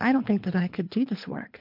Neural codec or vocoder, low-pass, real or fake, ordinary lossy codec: codec, 24 kHz, 3 kbps, HILCodec; 5.4 kHz; fake; MP3, 48 kbps